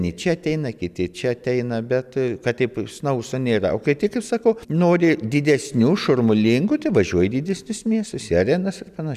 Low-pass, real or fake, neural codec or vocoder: 14.4 kHz; real; none